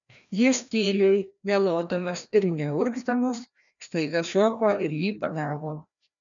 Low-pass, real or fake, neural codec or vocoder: 7.2 kHz; fake; codec, 16 kHz, 1 kbps, FreqCodec, larger model